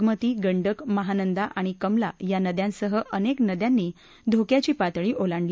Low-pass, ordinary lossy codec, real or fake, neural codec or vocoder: none; none; real; none